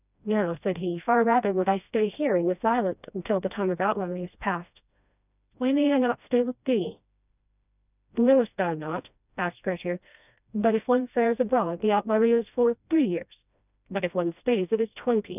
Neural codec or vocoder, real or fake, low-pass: codec, 16 kHz, 1 kbps, FreqCodec, smaller model; fake; 3.6 kHz